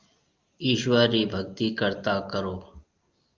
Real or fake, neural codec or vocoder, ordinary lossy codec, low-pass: real; none; Opus, 32 kbps; 7.2 kHz